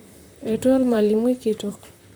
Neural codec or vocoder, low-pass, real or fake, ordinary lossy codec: vocoder, 44.1 kHz, 128 mel bands, Pupu-Vocoder; none; fake; none